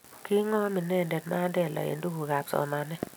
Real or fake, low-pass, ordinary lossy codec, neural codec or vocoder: real; none; none; none